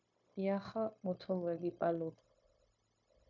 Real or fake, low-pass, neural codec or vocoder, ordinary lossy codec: fake; 7.2 kHz; codec, 16 kHz, 0.9 kbps, LongCat-Audio-Codec; MP3, 48 kbps